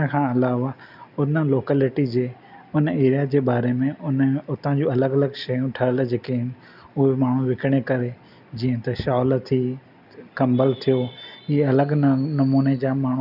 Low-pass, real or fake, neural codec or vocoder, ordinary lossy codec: 5.4 kHz; real; none; none